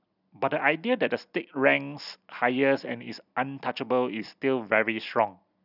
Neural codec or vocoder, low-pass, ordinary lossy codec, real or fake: none; 5.4 kHz; none; real